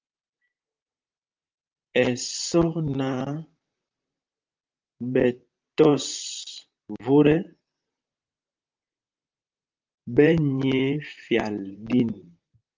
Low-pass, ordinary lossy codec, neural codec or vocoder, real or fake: 7.2 kHz; Opus, 24 kbps; vocoder, 44.1 kHz, 128 mel bands, Pupu-Vocoder; fake